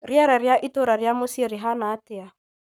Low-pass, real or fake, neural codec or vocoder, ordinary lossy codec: none; fake; codec, 44.1 kHz, 7.8 kbps, Pupu-Codec; none